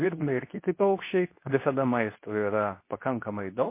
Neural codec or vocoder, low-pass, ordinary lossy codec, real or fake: codec, 24 kHz, 0.9 kbps, WavTokenizer, medium speech release version 2; 3.6 kHz; MP3, 24 kbps; fake